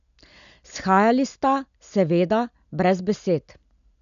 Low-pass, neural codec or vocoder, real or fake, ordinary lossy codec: 7.2 kHz; none; real; none